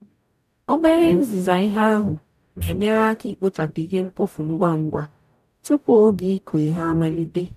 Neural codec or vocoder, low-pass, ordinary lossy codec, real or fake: codec, 44.1 kHz, 0.9 kbps, DAC; 14.4 kHz; none; fake